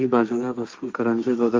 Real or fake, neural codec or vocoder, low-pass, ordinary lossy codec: fake; codec, 16 kHz, 1.1 kbps, Voila-Tokenizer; 7.2 kHz; Opus, 24 kbps